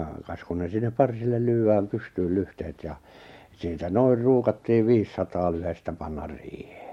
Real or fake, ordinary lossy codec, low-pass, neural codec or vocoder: real; MP3, 64 kbps; 19.8 kHz; none